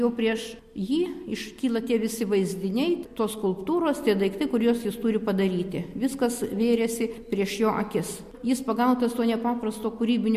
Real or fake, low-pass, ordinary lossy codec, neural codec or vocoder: real; 14.4 kHz; MP3, 64 kbps; none